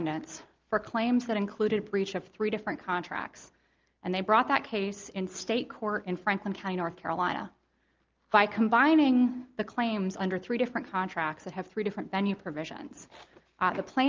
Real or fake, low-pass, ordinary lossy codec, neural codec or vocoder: real; 7.2 kHz; Opus, 32 kbps; none